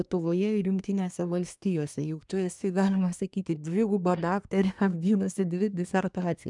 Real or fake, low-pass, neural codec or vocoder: fake; 10.8 kHz; codec, 24 kHz, 1 kbps, SNAC